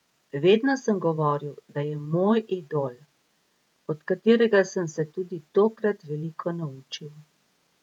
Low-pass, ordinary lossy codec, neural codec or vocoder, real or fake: 19.8 kHz; none; vocoder, 48 kHz, 128 mel bands, Vocos; fake